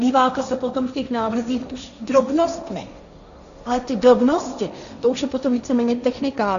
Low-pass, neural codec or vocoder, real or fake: 7.2 kHz; codec, 16 kHz, 1.1 kbps, Voila-Tokenizer; fake